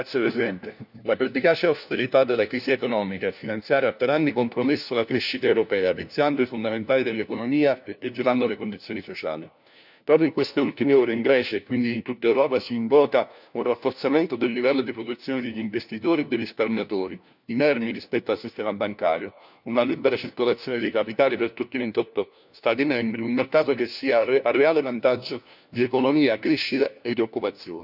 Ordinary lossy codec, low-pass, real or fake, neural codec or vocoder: none; 5.4 kHz; fake; codec, 16 kHz, 1 kbps, FunCodec, trained on LibriTTS, 50 frames a second